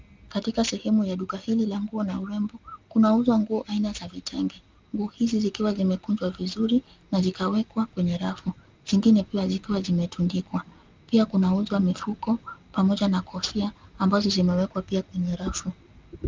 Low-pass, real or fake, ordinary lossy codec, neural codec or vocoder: 7.2 kHz; real; Opus, 24 kbps; none